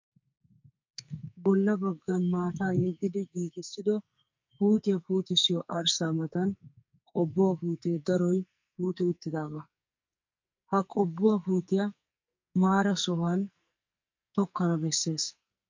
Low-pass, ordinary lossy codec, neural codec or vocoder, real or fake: 7.2 kHz; MP3, 48 kbps; codec, 44.1 kHz, 2.6 kbps, SNAC; fake